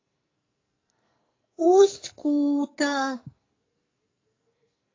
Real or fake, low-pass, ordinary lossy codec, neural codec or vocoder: fake; 7.2 kHz; AAC, 32 kbps; codec, 44.1 kHz, 2.6 kbps, SNAC